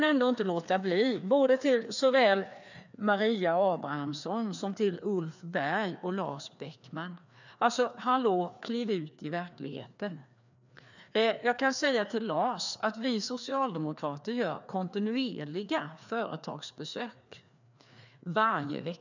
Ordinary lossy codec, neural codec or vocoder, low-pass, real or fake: none; codec, 16 kHz, 2 kbps, FreqCodec, larger model; 7.2 kHz; fake